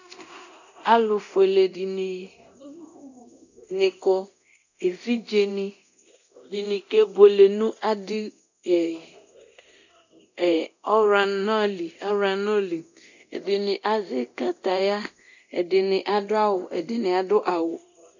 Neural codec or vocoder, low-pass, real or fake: codec, 24 kHz, 0.9 kbps, DualCodec; 7.2 kHz; fake